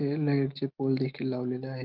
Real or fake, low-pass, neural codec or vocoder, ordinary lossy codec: real; 5.4 kHz; none; Opus, 24 kbps